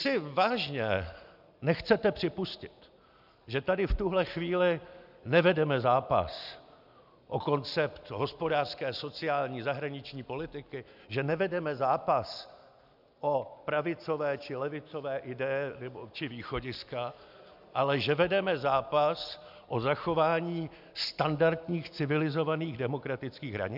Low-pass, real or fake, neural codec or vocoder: 5.4 kHz; real; none